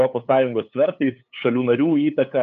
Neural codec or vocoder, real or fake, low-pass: codec, 16 kHz, 8 kbps, FunCodec, trained on LibriTTS, 25 frames a second; fake; 7.2 kHz